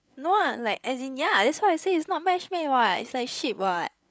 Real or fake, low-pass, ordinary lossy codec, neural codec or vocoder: fake; none; none; codec, 16 kHz, 8 kbps, FreqCodec, larger model